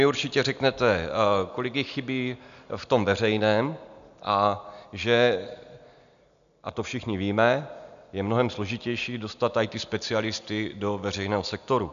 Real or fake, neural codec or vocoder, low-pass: real; none; 7.2 kHz